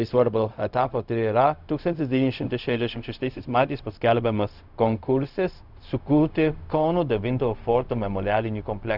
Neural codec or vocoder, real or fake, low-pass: codec, 16 kHz, 0.4 kbps, LongCat-Audio-Codec; fake; 5.4 kHz